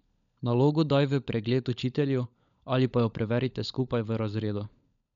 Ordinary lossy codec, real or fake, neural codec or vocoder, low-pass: none; fake; codec, 16 kHz, 16 kbps, FunCodec, trained on LibriTTS, 50 frames a second; 7.2 kHz